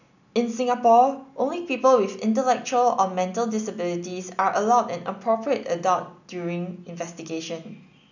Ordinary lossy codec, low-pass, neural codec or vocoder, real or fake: none; 7.2 kHz; none; real